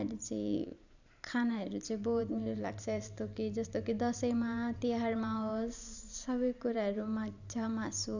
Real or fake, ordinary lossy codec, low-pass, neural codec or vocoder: fake; none; 7.2 kHz; vocoder, 44.1 kHz, 128 mel bands every 512 samples, BigVGAN v2